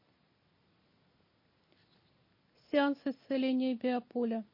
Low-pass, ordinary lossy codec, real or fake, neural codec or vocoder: 5.4 kHz; MP3, 24 kbps; real; none